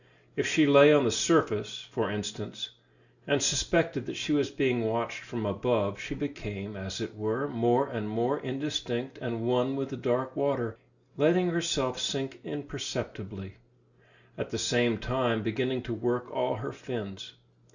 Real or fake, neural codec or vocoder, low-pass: real; none; 7.2 kHz